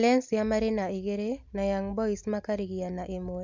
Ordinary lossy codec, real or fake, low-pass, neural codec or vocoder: MP3, 64 kbps; real; 7.2 kHz; none